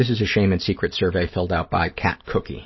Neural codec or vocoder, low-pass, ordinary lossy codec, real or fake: none; 7.2 kHz; MP3, 24 kbps; real